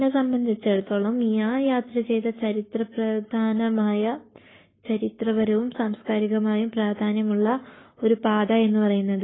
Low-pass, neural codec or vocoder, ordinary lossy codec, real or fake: 7.2 kHz; codec, 44.1 kHz, 7.8 kbps, Pupu-Codec; AAC, 16 kbps; fake